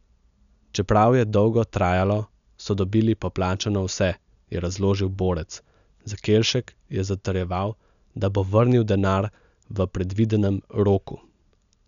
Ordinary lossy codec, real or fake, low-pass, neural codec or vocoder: none; real; 7.2 kHz; none